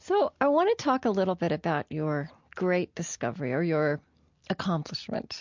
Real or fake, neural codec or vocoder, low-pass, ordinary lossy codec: real; none; 7.2 kHz; MP3, 64 kbps